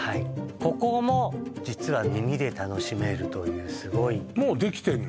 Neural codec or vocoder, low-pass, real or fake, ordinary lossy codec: none; none; real; none